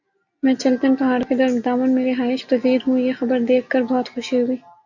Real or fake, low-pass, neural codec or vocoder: real; 7.2 kHz; none